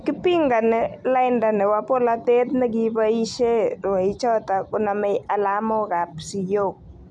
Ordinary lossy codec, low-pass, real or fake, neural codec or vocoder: none; none; real; none